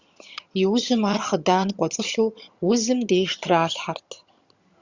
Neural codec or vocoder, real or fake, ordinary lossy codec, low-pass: vocoder, 22.05 kHz, 80 mel bands, HiFi-GAN; fake; Opus, 64 kbps; 7.2 kHz